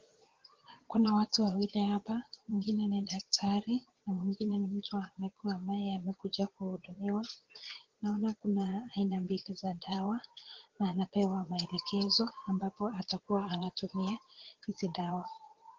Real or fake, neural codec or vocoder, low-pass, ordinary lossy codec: fake; vocoder, 22.05 kHz, 80 mel bands, Vocos; 7.2 kHz; Opus, 16 kbps